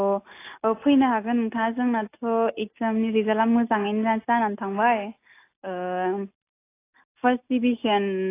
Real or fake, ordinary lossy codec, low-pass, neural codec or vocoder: real; AAC, 24 kbps; 3.6 kHz; none